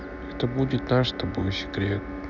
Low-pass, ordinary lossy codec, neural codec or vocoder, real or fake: 7.2 kHz; none; none; real